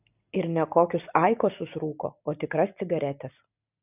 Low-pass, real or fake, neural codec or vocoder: 3.6 kHz; real; none